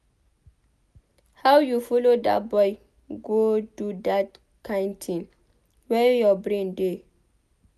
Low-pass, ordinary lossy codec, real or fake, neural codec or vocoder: 14.4 kHz; none; real; none